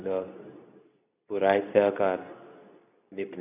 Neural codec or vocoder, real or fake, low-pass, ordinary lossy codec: codec, 24 kHz, 0.9 kbps, WavTokenizer, medium speech release version 2; fake; 3.6 kHz; none